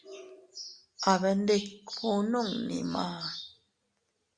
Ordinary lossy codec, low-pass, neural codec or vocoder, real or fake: Opus, 64 kbps; 9.9 kHz; none; real